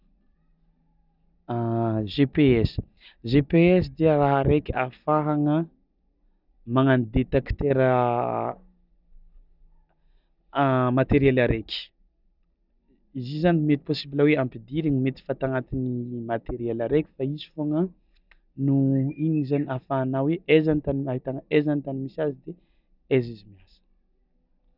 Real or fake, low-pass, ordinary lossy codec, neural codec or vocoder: real; 5.4 kHz; none; none